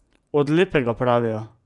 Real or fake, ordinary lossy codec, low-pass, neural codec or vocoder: fake; none; 10.8 kHz; vocoder, 24 kHz, 100 mel bands, Vocos